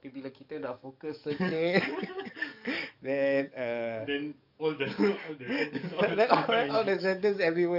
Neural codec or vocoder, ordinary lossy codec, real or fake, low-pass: codec, 44.1 kHz, 7.8 kbps, DAC; none; fake; 5.4 kHz